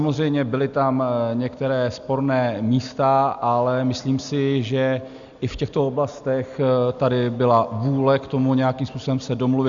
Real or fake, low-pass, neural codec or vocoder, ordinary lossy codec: real; 7.2 kHz; none; Opus, 64 kbps